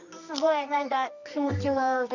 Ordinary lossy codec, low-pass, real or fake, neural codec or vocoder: none; 7.2 kHz; fake; codec, 24 kHz, 0.9 kbps, WavTokenizer, medium music audio release